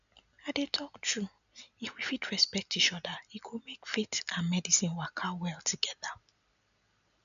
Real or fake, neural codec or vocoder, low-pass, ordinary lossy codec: real; none; 7.2 kHz; none